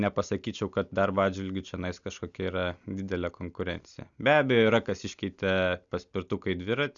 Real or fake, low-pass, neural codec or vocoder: real; 7.2 kHz; none